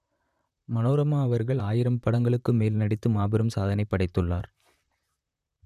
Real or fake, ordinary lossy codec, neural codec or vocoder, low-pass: fake; none; vocoder, 44.1 kHz, 128 mel bands, Pupu-Vocoder; 14.4 kHz